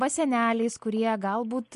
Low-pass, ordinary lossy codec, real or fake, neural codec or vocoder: 14.4 kHz; MP3, 48 kbps; real; none